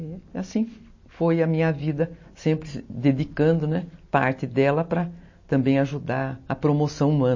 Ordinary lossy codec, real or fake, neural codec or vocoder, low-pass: MP3, 32 kbps; real; none; 7.2 kHz